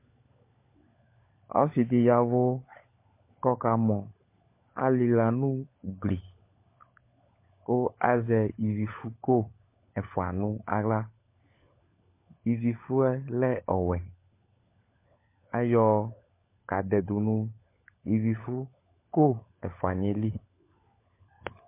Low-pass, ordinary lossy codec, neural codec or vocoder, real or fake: 3.6 kHz; MP3, 24 kbps; codec, 16 kHz, 16 kbps, FunCodec, trained on LibriTTS, 50 frames a second; fake